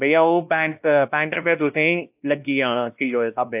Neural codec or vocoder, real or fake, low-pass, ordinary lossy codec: codec, 16 kHz, 1 kbps, X-Codec, WavLM features, trained on Multilingual LibriSpeech; fake; 3.6 kHz; AAC, 32 kbps